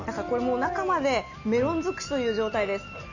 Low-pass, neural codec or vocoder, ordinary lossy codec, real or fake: 7.2 kHz; none; none; real